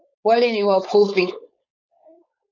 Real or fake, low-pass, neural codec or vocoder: fake; 7.2 kHz; codec, 16 kHz, 4.8 kbps, FACodec